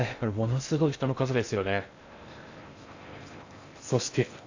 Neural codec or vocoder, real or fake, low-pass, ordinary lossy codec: codec, 16 kHz in and 24 kHz out, 0.6 kbps, FocalCodec, streaming, 4096 codes; fake; 7.2 kHz; AAC, 48 kbps